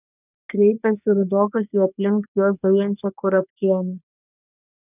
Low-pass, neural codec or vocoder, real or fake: 3.6 kHz; codec, 44.1 kHz, 3.4 kbps, Pupu-Codec; fake